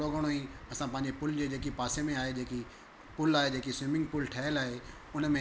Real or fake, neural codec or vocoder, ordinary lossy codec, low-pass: real; none; none; none